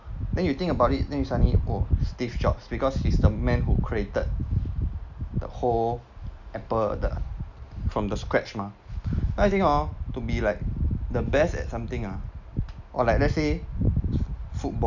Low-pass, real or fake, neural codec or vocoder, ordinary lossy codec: 7.2 kHz; real; none; none